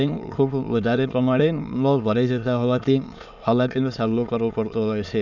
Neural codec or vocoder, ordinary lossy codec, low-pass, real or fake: autoencoder, 22.05 kHz, a latent of 192 numbers a frame, VITS, trained on many speakers; none; 7.2 kHz; fake